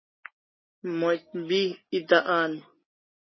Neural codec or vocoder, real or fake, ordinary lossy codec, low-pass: none; real; MP3, 24 kbps; 7.2 kHz